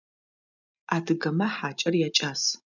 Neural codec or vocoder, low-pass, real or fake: none; 7.2 kHz; real